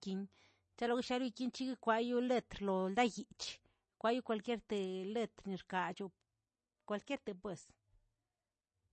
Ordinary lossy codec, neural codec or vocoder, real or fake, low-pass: MP3, 32 kbps; none; real; 10.8 kHz